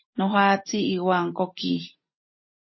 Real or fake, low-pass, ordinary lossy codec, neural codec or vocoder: real; 7.2 kHz; MP3, 24 kbps; none